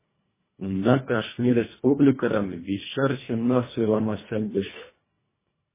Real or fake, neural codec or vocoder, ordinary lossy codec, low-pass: fake; codec, 24 kHz, 1.5 kbps, HILCodec; MP3, 16 kbps; 3.6 kHz